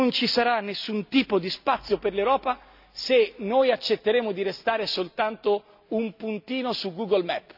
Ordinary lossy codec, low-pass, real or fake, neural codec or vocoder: none; 5.4 kHz; real; none